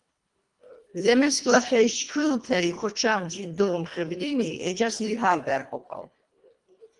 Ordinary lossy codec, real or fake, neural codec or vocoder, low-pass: Opus, 32 kbps; fake; codec, 24 kHz, 1.5 kbps, HILCodec; 10.8 kHz